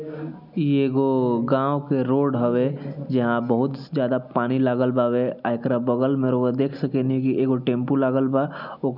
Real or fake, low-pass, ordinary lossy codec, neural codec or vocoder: real; 5.4 kHz; none; none